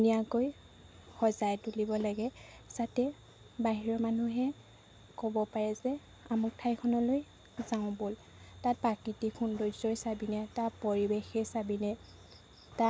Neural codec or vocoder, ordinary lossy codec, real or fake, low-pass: none; none; real; none